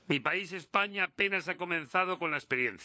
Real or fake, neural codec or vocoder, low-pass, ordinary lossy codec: fake; codec, 16 kHz, 4 kbps, FunCodec, trained on LibriTTS, 50 frames a second; none; none